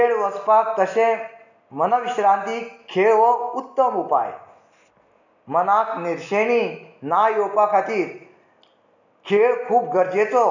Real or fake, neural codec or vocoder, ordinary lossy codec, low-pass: real; none; none; 7.2 kHz